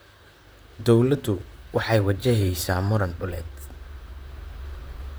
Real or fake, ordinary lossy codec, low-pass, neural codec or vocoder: fake; none; none; vocoder, 44.1 kHz, 128 mel bands, Pupu-Vocoder